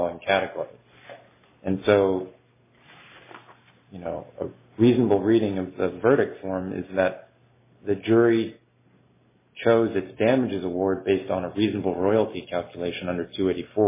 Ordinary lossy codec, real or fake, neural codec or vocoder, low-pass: MP3, 16 kbps; real; none; 3.6 kHz